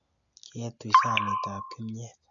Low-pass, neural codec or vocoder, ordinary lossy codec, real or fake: 7.2 kHz; none; none; real